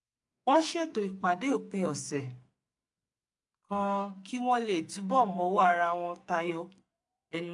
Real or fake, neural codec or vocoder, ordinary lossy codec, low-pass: fake; codec, 44.1 kHz, 2.6 kbps, SNAC; AAC, 64 kbps; 10.8 kHz